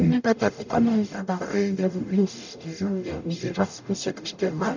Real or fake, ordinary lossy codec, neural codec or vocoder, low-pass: fake; none; codec, 44.1 kHz, 0.9 kbps, DAC; 7.2 kHz